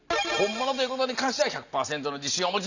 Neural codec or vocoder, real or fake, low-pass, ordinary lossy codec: none; real; 7.2 kHz; none